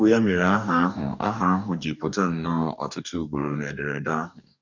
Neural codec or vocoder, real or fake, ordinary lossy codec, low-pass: codec, 44.1 kHz, 2.6 kbps, DAC; fake; none; 7.2 kHz